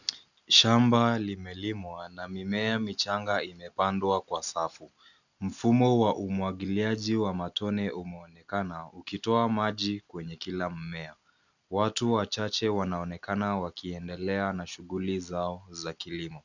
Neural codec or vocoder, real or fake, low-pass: none; real; 7.2 kHz